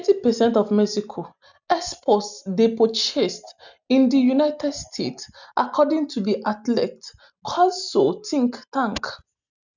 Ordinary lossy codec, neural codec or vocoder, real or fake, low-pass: none; none; real; 7.2 kHz